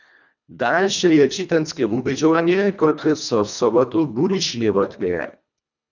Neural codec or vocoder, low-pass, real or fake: codec, 24 kHz, 1.5 kbps, HILCodec; 7.2 kHz; fake